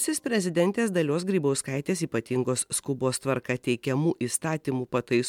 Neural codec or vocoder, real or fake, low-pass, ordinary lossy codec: none; real; 19.8 kHz; MP3, 96 kbps